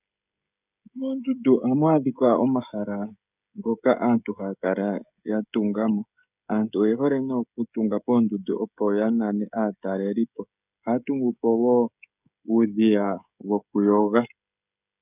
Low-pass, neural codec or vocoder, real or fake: 3.6 kHz; codec, 16 kHz, 16 kbps, FreqCodec, smaller model; fake